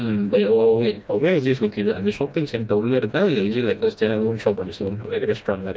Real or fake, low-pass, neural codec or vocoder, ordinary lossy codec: fake; none; codec, 16 kHz, 1 kbps, FreqCodec, smaller model; none